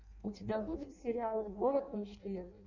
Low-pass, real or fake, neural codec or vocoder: 7.2 kHz; fake; codec, 16 kHz in and 24 kHz out, 0.6 kbps, FireRedTTS-2 codec